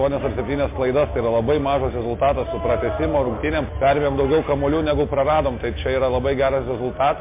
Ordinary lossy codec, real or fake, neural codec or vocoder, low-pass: MP3, 24 kbps; real; none; 3.6 kHz